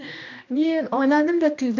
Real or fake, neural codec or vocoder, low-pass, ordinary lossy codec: fake; codec, 16 kHz, 1 kbps, X-Codec, HuBERT features, trained on general audio; 7.2 kHz; none